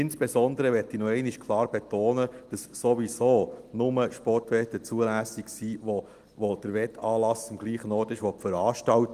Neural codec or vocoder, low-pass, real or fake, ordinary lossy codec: none; 14.4 kHz; real; Opus, 24 kbps